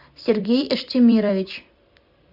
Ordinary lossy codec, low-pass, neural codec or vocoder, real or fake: MP3, 48 kbps; 5.4 kHz; vocoder, 44.1 kHz, 128 mel bands every 256 samples, BigVGAN v2; fake